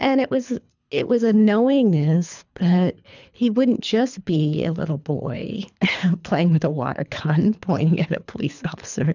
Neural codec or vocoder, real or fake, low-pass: codec, 24 kHz, 3 kbps, HILCodec; fake; 7.2 kHz